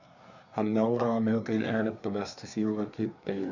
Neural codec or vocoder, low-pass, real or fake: codec, 24 kHz, 1 kbps, SNAC; 7.2 kHz; fake